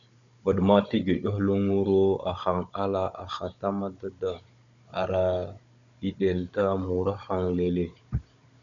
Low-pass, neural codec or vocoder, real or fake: 7.2 kHz; codec, 16 kHz, 16 kbps, FunCodec, trained on Chinese and English, 50 frames a second; fake